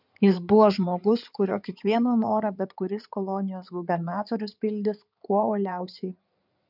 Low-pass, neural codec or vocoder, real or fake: 5.4 kHz; codec, 16 kHz in and 24 kHz out, 2.2 kbps, FireRedTTS-2 codec; fake